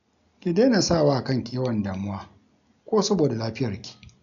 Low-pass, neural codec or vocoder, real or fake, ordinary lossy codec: 7.2 kHz; none; real; none